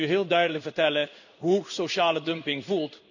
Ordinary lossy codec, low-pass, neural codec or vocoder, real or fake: none; 7.2 kHz; codec, 16 kHz in and 24 kHz out, 1 kbps, XY-Tokenizer; fake